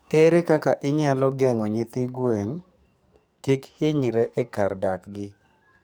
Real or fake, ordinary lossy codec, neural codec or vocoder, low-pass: fake; none; codec, 44.1 kHz, 2.6 kbps, SNAC; none